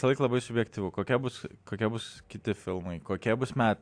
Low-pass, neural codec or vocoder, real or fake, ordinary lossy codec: 9.9 kHz; none; real; AAC, 64 kbps